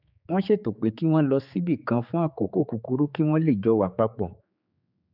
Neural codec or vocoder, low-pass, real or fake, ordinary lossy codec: codec, 16 kHz, 4 kbps, X-Codec, HuBERT features, trained on general audio; 5.4 kHz; fake; none